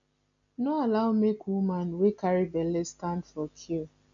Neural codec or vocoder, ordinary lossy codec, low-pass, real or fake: none; none; 7.2 kHz; real